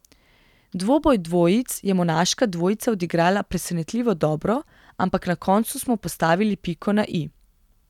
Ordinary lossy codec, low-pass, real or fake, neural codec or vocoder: none; 19.8 kHz; real; none